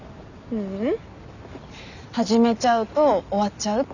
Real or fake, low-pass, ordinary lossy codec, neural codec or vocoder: real; 7.2 kHz; none; none